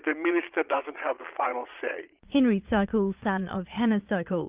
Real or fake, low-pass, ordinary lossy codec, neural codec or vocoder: fake; 3.6 kHz; Opus, 24 kbps; vocoder, 22.05 kHz, 80 mel bands, Vocos